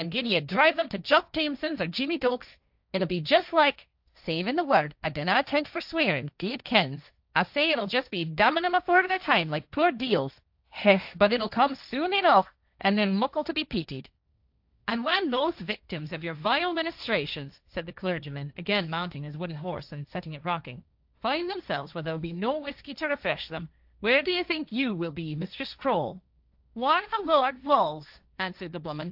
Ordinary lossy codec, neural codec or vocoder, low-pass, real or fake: AAC, 48 kbps; codec, 16 kHz, 1.1 kbps, Voila-Tokenizer; 5.4 kHz; fake